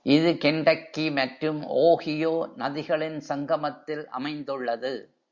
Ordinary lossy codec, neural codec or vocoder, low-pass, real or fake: Opus, 64 kbps; none; 7.2 kHz; real